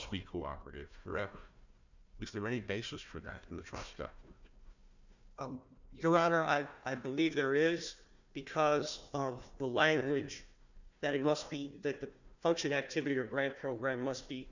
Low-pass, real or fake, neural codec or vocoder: 7.2 kHz; fake; codec, 16 kHz, 1 kbps, FunCodec, trained on Chinese and English, 50 frames a second